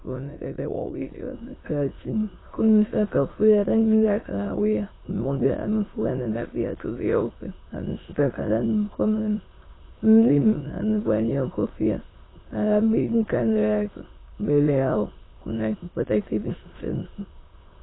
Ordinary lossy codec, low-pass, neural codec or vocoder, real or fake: AAC, 16 kbps; 7.2 kHz; autoencoder, 22.05 kHz, a latent of 192 numbers a frame, VITS, trained on many speakers; fake